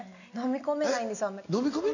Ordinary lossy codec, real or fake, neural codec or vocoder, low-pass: none; real; none; 7.2 kHz